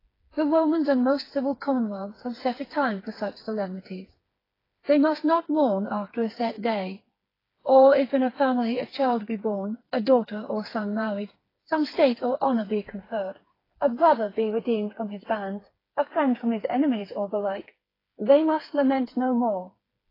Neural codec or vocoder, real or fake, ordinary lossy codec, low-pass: codec, 16 kHz, 4 kbps, FreqCodec, smaller model; fake; AAC, 24 kbps; 5.4 kHz